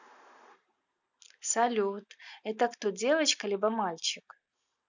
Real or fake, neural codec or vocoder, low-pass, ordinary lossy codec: real; none; 7.2 kHz; none